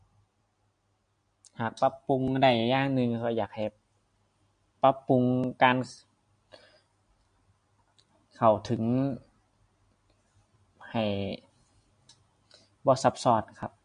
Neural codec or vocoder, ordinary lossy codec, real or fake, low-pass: none; MP3, 48 kbps; real; 9.9 kHz